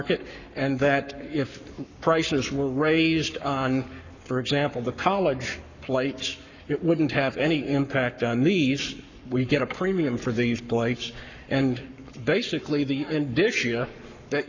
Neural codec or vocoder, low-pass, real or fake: codec, 44.1 kHz, 7.8 kbps, Pupu-Codec; 7.2 kHz; fake